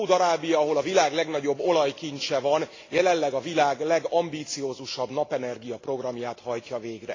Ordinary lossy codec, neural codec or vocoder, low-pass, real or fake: AAC, 32 kbps; none; 7.2 kHz; real